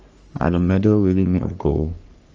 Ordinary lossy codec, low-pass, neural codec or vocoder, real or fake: Opus, 24 kbps; 7.2 kHz; codec, 44.1 kHz, 3.4 kbps, Pupu-Codec; fake